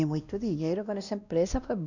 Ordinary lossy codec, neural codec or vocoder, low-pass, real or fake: none; codec, 16 kHz, 1 kbps, X-Codec, WavLM features, trained on Multilingual LibriSpeech; 7.2 kHz; fake